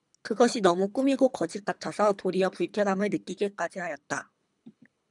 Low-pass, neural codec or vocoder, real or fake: 10.8 kHz; codec, 24 kHz, 3 kbps, HILCodec; fake